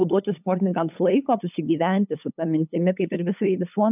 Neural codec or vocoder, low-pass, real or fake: codec, 16 kHz, 8 kbps, FunCodec, trained on LibriTTS, 25 frames a second; 3.6 kHz; fake